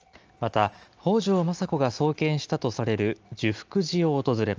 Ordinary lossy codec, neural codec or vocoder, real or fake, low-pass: Opus, 24 kbps; none; real; 7.2 kHz